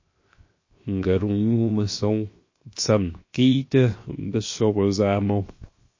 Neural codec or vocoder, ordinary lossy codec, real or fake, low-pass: codec, 16 kHz, 0.7 kbps, FocalCodec; MP3, 32 kbps; fake; 7.2 kHz